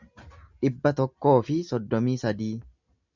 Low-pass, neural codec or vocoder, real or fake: 7.2 kHz; none; real